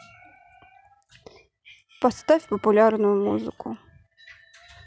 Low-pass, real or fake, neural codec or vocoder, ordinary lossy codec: none; real; none; none